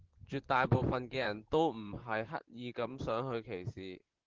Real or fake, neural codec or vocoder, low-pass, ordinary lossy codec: fake; vocoder, 44.1 kHz, 128 mel bands, Pupu-Vocoder; 7.2 kHz; Opus, 16 kbps